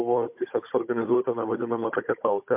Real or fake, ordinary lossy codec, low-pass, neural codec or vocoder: fake; MP3, 32 kbps; 3.6 kHz; codec, 16 kHz, 16 kbps, FunCodec, trained on Chinese and English, 50 frames a second